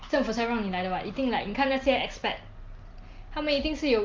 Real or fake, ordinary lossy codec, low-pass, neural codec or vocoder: real; Opus, 32 kbps; 7.2 kHz; none